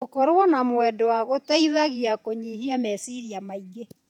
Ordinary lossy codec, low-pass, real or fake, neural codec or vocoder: none; 19.8 kHz; fake; vocoder, 48 kHz, 128 mel bands, Vocos